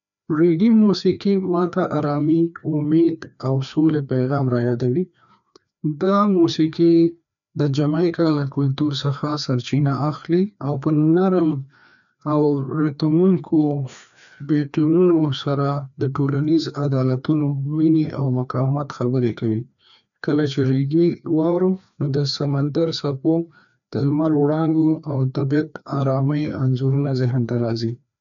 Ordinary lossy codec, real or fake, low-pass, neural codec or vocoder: none; fake; 7.2 kHz; codec, 16 kHz, 2 kbps, FreqCodec, larger model